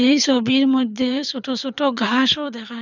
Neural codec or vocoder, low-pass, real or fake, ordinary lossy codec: codec, 24 kHz, 6 kbps, HILCodec; 7.2 kHz; fake; none